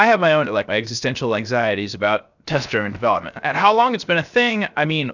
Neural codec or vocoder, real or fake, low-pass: codec, 16 kHz, 0.7 kbps, FocalCodec; fake; 7.2 kHz